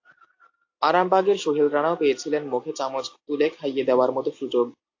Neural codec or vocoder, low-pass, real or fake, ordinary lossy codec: none; 7.2 kHz; real; AAC, 48 kbps